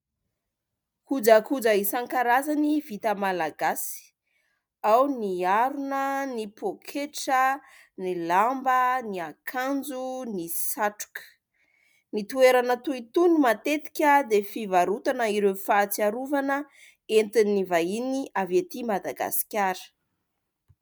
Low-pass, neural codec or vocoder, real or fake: 19.8 kHz; none; real